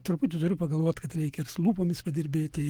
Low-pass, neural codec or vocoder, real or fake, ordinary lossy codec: 19.8 kHz; codec, 44.1 kHz, 7.8 kbps, Pupu-Codec; fake; Opus, 24 kbps